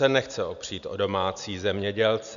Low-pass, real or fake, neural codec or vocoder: 7.2 kHz; real; none